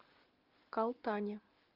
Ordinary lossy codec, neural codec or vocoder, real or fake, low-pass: Opus, 32 kbps; none; real; 5.4 kHz